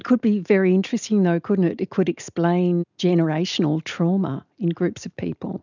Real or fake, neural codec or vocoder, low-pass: real; none; 7.2 kHz